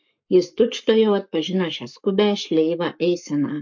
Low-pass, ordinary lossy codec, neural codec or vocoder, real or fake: 7.2 kHz; MP3, 48 kbps; codec, 44.1 kHz, 7.8 kbps, Pupu-Codec; fake